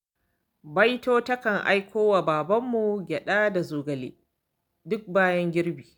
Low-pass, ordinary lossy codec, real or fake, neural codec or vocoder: 19.8 kHz; none; real; none